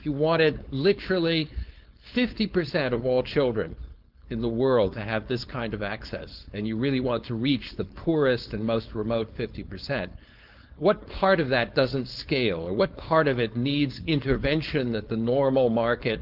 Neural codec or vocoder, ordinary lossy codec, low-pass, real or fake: codec, 16 kHz, 4.8 kbps, FACodec; Opus, 32 kbps; 5.4 kHz; fake